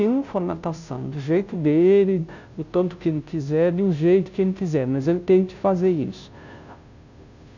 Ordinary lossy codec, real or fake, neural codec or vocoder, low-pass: none; fake; codec, 16 kHz, 0.5 kbps, FunCodec, trained on Chinese and English, 25 frames a second; 7.2 kHz